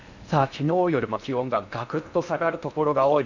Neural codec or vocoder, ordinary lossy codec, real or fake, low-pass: codec, 16 kHz in and 24 kHz out, 0.8 kbps, FocalCodec, streaming, 65536 codes; none; fake; 7.2 kHz